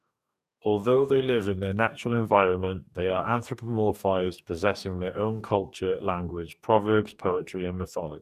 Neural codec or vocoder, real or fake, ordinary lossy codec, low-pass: codec, 44.1 kHz, 2.6 kbps, DAC; fake; none; 14.4 kHz